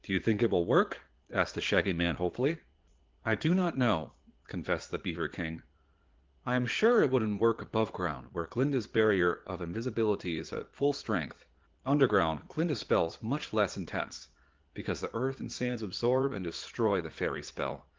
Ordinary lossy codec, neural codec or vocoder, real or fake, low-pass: Opus, 16 kbps; vocoder, 22.05 kHz, 80 mel bands, Vocos; fake; 7.2 kHz